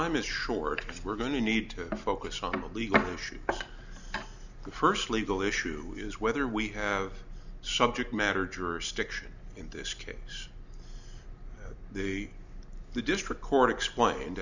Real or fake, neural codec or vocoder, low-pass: real; none; 7.2 kHz